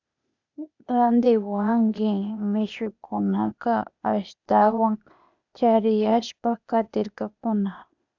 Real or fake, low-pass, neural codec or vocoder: fake; 7.2 kHz; codec, 16 kHz, 0.8 kbps, ZipCodec